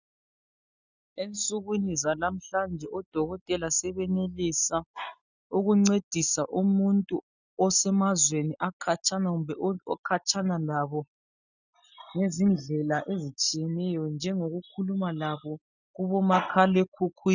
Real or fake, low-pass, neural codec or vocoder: real; 7.2 kHz; none